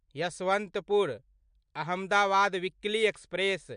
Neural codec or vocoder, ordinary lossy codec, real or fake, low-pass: none; MP3, 64 kbps; real; 9.9 kHz